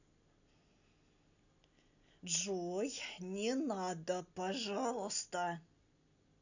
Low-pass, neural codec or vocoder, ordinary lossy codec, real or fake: 7.2 kHz; none; none; real